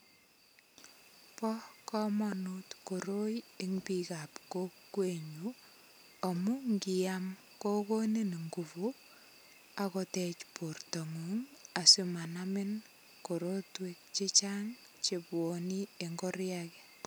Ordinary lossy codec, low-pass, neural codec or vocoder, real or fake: none; none; none; real